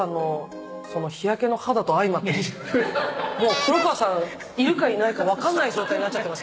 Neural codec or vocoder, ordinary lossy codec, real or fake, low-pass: none; none; real; none